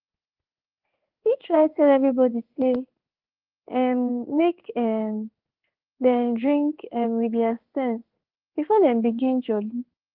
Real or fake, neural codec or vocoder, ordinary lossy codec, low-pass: fake; codec, 16 kHz in and 24 kHz out, 1 kbps, XY-Tokenizer; Opus, 32 kbps; 5.4 kHz